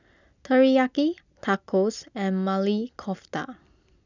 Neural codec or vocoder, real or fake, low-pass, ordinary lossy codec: none; real; 7.2 kHz; none